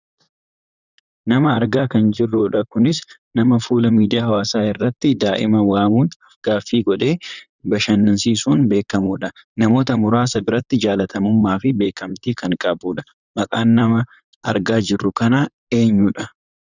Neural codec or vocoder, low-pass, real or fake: vocoder, 44.1 kHz, 128 mel bands, Pupu-Vocoder; 7.2 kHz; fake